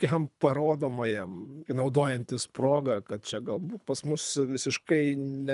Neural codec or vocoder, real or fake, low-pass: codec, 24 kHz, 3 kbps, HILCodec; fake; 10.8 kHz